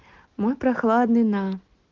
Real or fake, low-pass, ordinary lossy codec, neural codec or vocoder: real; 7.2 kHz; Opus, 16 kbps; none